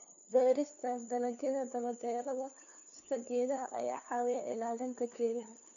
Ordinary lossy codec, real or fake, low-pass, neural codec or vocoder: none; fake; 7.2 kHz; codec, 16 kHz, 2 kbps, FunCodec, trained on LibriTTS, 25 frames a second